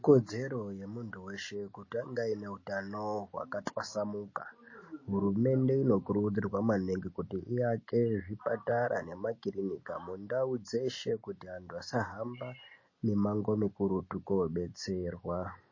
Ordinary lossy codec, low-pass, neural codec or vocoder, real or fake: MP3, 32 kbps; 7.2 kHz; none; real